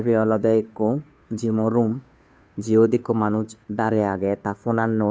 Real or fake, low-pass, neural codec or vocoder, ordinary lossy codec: fake; none; codec, 16 kHz, 2 kbps, FunCodec, trained on Chinese and English, 25 frames a second; none